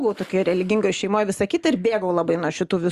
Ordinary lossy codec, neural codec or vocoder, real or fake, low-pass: Opus, 64 kbps; none; real; 14.4 kHz